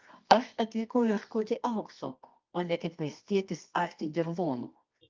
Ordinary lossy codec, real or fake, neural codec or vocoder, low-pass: Opus, 24 kbps; fake; codec, 24 kHz, 0.9 kbps, WavTokenizer, medium music audio release; 7.2 kHz